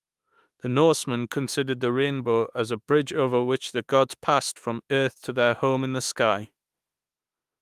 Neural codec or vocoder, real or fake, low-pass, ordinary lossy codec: autoencoder, 48 kHz, 32 numbers a frame, DAC-VAE, trained on Japanese speech; fake; 14.4 kHz; Opus, 32 kbps